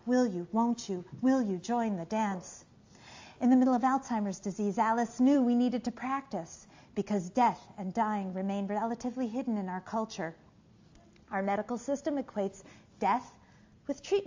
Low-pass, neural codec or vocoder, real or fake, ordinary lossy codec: 7.2 kHz; none; real; AAC, 48 kbps